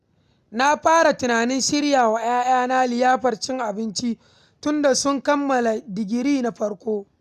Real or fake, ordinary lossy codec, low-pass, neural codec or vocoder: real; none; 14.4 kHz; none